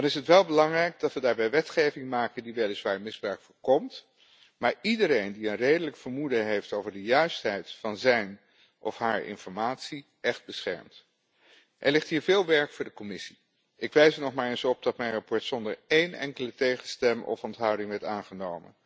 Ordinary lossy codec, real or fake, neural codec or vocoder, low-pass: none; real; none; none